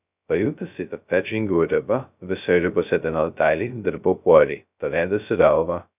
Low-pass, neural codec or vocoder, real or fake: 3.6 kHz; codec, 16 kHz, 0.2 kbps, FocalCodec; fake